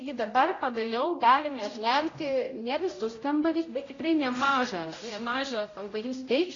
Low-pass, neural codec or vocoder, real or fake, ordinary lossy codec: 7.2 kHz; codec, 16 kHz, 0.5 kbps, X-Codec, HuBERT features, trained on general audio; fake; AAC, 32 kbps